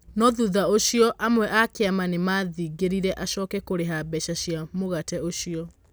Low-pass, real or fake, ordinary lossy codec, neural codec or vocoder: none; real; none; none